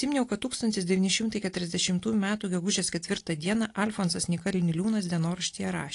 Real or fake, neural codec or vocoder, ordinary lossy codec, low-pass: real; none; AAC, 48 kbps; 10.8 kHz